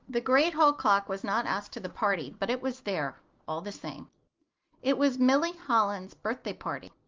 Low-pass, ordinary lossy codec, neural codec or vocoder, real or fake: 7.2 kHz; Opus, 32 kbps; none; real